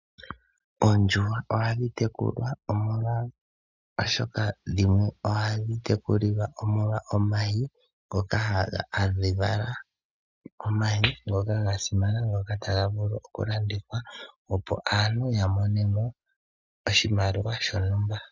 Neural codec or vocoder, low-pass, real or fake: none; 7.2 kHz; real